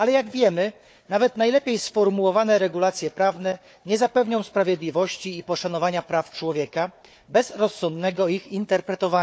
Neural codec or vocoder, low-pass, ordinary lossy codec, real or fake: codec, 16 kHz, 4 kbps, FunCodec, trained on Chinese and English, 50 frames a second; none; none; fake